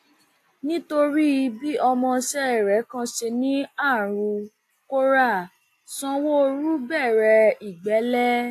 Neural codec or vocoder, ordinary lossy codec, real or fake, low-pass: none; AAC, 64 kbps; real; 14.4 kHz